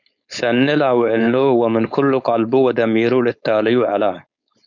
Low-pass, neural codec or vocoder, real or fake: 7.2 kHz; codec, 16 kHz, 4.8 kbps, FACodec; fake